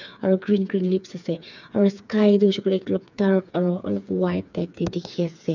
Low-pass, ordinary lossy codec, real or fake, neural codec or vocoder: 7.2 kHz; none; fake; codec, 16 kHz, 4 kbps, FreqCodec, smaller model